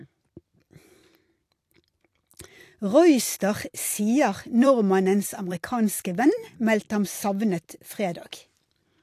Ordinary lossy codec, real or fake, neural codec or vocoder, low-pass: AAC, 64 kbps; fake; vocoder, 44.1 kHz, 128 mel bands every 256 samples, BigVGAN v2; 14.4 kHz